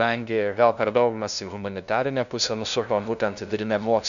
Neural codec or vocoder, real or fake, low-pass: codec, 16 kHz, 0.5 kbps, FunCodec, trained on LibriTTS, 25 frames a second; fake; 7.2 kHz